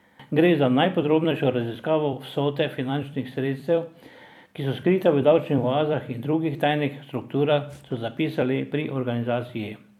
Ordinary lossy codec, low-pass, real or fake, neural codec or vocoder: none; 19.8 kHz; fake; vocoder, 44.1 kHz, 128 mel bands every 256 samples, BigVGAN v2